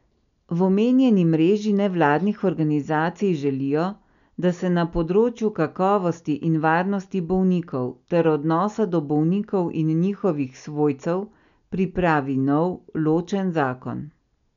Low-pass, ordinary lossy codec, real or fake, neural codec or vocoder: 7.2 kHz; none; real; none